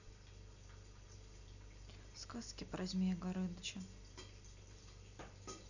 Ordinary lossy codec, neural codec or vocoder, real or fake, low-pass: none; none; real; 7.2 kHz